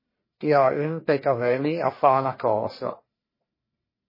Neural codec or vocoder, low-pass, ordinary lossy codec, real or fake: codec, 44.1 kHz, 1.7 kbps, Pupu-Codec; 5.4 kHz; MP3, 24 kbps; fake